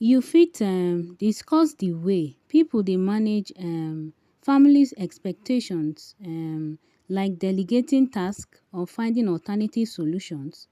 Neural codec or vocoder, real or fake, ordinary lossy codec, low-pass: none; real; none; 14.4 kHz